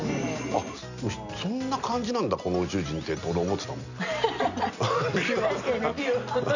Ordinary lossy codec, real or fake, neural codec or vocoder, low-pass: none; real; none; 7.2 kHz